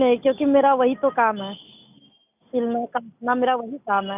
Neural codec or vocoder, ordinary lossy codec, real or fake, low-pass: none; none; real; 3.6 kHz